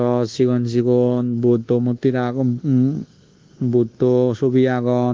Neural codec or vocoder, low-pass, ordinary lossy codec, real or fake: codec, 24 kHz, 1.2 kbps, DualCodec; 7.2 kHz; Opus, 16 kbps; fake